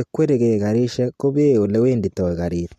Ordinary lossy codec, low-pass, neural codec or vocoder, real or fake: MP3, 64 kbps; 14.4 kHz; none; real